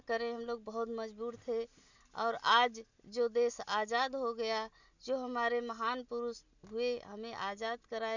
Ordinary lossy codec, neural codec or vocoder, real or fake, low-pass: none; none; real; 7.2 kHz